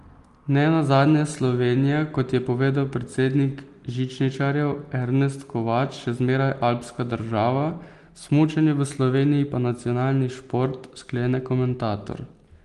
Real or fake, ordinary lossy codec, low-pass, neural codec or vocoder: real; Opus, 24 kbps; 10.8 kHz; none